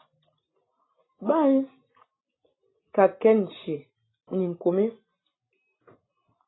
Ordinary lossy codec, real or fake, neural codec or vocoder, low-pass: AAC, 16 kbps; real; none; 7.2 kHz